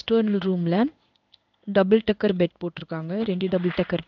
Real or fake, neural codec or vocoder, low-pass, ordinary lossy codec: fake; codec, 16 kHz in and 24 kHz out, 1 kbps, XY-Tokenizer; 7.2 kHz; none